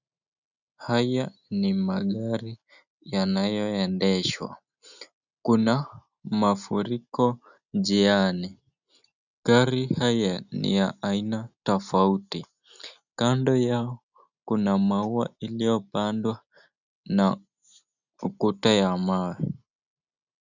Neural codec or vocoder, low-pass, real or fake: none; 7.2 kHz; real